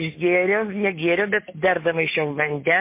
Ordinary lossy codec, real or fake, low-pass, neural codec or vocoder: MP3, 24 kbps; real; 3.6 kHz; none